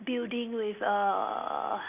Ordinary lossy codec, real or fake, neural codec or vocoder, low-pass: none; real; none; 3.6 kHz